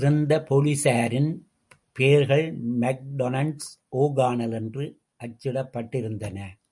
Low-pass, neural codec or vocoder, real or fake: 10.8 kHz; none; real